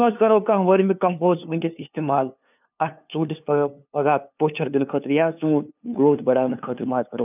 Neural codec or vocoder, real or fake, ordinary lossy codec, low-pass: codec, 16 kHz, 2 kbps, FunCodec, trained on LibriTTS, 25 frames a second; fake; none; 3.6 kHz